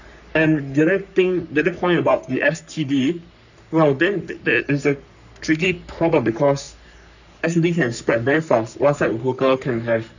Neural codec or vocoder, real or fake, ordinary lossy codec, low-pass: codec, 44.1 kHz, 3.4 kbps, Pupu-Codec; fake; none; 7.2 kHz